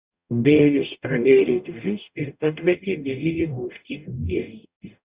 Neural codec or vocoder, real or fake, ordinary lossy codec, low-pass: codec, 44.1 kHz, 0.9 kbps, DAC; fake; Opus, 64 kbps; 3.6 kHz